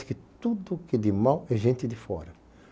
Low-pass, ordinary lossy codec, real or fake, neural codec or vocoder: none; none; real; none